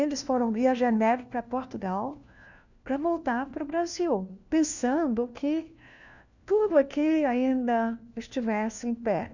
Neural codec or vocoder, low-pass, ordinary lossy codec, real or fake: codec, 16 kHz, 1 kbps, FunCodec, trained on LibriTTS, 50 frames a second; 7.2 kHz; none; fake